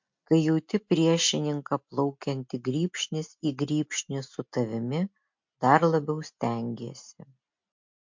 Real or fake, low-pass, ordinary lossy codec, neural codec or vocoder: real; 7.2 kHz; MP3, 48 kbps; none